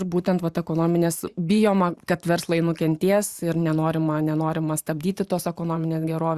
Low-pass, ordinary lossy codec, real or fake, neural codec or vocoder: 14.4 kHz; Opus, 64 kbps; fake; vocoder, 44.1 kHz, 128 mel bands every 512 samples, BigVGAN v2